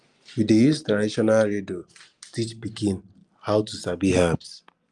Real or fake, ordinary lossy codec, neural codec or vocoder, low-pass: real; Opus, 32 kbps; none; 10.8 kHz